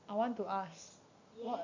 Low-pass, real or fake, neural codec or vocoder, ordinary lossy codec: 7.2 kHz; real; none; AAC, 48 kbps